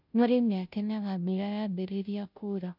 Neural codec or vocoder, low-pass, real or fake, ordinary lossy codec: codec, 16 kHz, 0.5 kbps, FunCodec, trained on Chinese and English, 25 frames a second; 5.4 kHz; fake; none